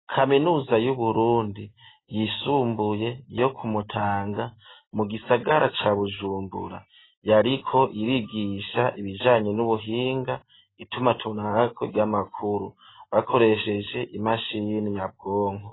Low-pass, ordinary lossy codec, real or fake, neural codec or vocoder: 7.2 kHz; AAC, 16 kbps; real; none